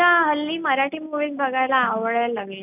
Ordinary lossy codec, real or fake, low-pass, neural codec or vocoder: none; real; 3.6 kHz; none